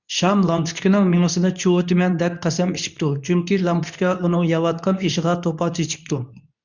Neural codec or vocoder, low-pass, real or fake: codec, 24 kHz, 0.9 kbps, WavTokenizer, medium speech release version 2; 7.2 kHz; fake